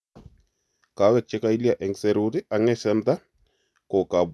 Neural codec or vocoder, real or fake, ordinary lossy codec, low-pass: none; real; none; none